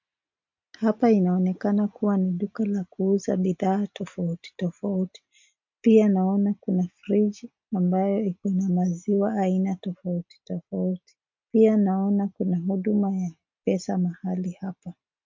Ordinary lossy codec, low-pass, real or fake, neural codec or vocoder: MP3, 48 kbps; 7.2 kHz; real; none